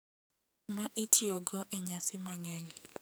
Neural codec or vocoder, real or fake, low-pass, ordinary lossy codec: codec, 44.1 kHz, 2.6 kbps, SNAC; fake; none; none